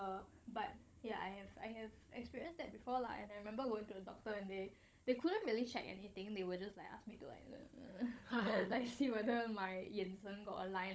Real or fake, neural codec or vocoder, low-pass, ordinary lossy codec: fake; codec, 16 kHz, 16 kbps, FunCodec, trained on Chinese and English, 50 frames a second; none; none